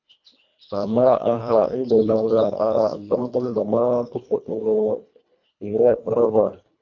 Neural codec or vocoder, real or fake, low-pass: codec, 24 kHz, 1.5 kbps, HILCodec; fake; 7.2 kHz